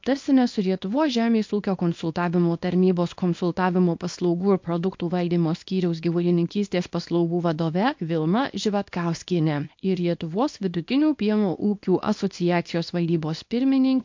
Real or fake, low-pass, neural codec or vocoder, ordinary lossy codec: fake; 7.2 kHz; codec, 24 kHz, 0.9 kbps, WavTokenizer, small release; MP3, 48 kbps